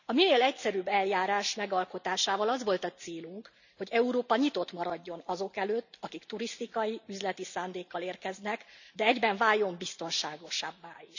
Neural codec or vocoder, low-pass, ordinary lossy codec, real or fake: none; 7.2 kHz; none; real